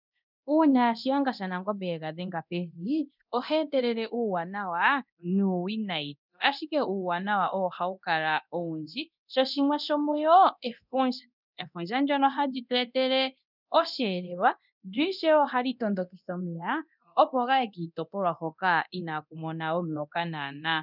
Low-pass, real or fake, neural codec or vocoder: 5.4 kHz; fake; codec, 24 kHz, 0.9 kbps, DualCodec